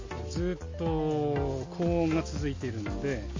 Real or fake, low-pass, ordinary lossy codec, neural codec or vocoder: real; 7.2 kHz; MP3, 32 kbps; none